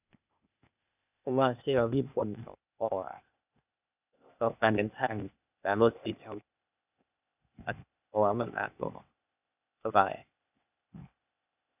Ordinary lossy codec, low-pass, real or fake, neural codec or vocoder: none; 3.6 kHz; fake; codec, 16 kHz, 0.8 kbps, ZipCodec